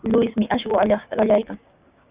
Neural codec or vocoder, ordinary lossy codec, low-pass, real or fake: codec, 16 kHz, 6 kbps, DAC; Opus, 64 kbps; 3.6 kHz; fake